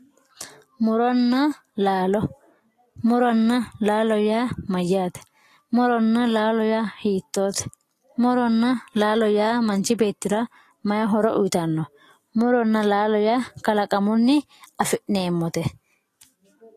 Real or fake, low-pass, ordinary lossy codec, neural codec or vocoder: real; 14.4 kHz; AAC, 48 kbps; none